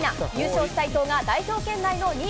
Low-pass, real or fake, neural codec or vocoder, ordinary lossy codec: none; real; none; none